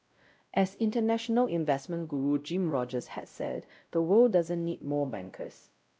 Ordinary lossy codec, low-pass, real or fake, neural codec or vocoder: none; none; fake; codec, 16 kHz, 0.5 kbps, X-Codec, WavLM features, trained on Multilingual LibriSpeech